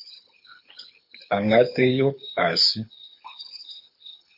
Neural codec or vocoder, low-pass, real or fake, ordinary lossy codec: codec, 24 kHz, 6 kbps, HILCodec; 5.4 kHz; fake; MP3, 32 kbps